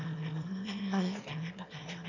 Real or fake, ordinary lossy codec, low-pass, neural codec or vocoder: fake; none; 7.2 kHz; autoencoder, 22.05 kHz, a latent of 192 numbers a frame, VITS, trained on one speaker